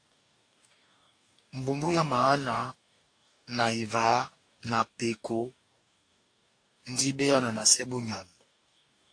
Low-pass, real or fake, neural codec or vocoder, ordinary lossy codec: 9.9 kHz; fake; codec, 44.1 kHz, 2.6 kbps, DAC; AAC, 48 kbps